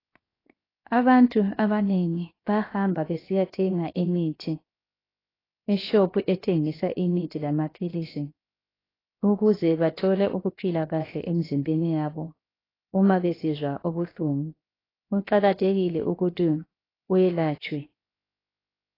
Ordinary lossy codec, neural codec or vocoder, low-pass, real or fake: AAC, 24 kbps; codec, 16 kHz, 0.7 kbps, FocalCodec; 5.4 kHz; fake